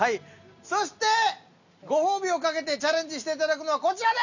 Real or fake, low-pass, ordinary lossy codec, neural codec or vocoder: real; 7.2 kHz; none; none